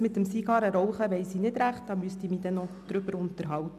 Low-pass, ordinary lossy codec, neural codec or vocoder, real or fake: 14.4 kHz; MP3, 96 kbps; none; real